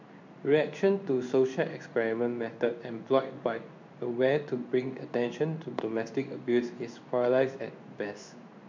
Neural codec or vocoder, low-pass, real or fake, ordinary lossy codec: codec, 16 kHz in and 24 kHz out, 1 kbps, XY-Tokenizer; 7.2 kHz; fake; MP3, 64 kbps